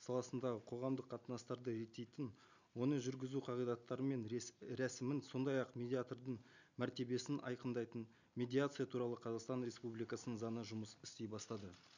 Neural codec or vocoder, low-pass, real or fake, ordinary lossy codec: none; 7.2 kHz; real; none